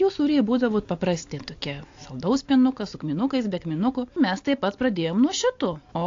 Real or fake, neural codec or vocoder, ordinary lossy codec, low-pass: real; none; AAC, 48 kbps; 7.2 kHz